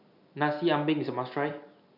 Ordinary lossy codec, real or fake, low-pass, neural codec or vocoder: none; real; 5.4 kHz; none